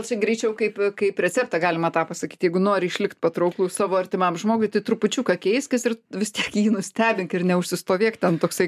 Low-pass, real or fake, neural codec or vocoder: 14.4 kHz; real; none